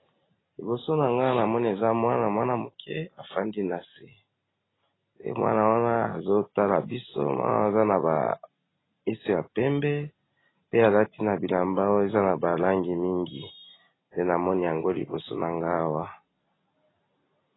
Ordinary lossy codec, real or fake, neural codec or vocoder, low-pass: AAC, 16 kbps; real; none; 7.2 kHz